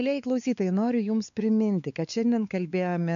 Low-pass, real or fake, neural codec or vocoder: 7.2 kHz; fake; codec, 16 kHz, 4 kbps, X-Codec, WavLM features, trained on Multilingual LibriSpeech